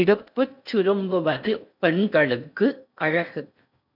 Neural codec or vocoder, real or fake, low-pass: codec, 16 kHz in and 24 kHz out, 0.6 kbps, FocalCodec, streaming, 2048 codes; fake; 5.4 kHz